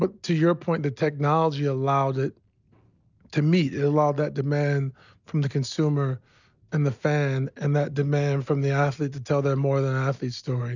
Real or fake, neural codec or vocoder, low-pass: real; none; 7.2 kHz